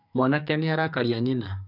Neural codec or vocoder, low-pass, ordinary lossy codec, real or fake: codec, 44.1 kHz, 2.6 kbps, SNAC; 5.4 kHz; none; fake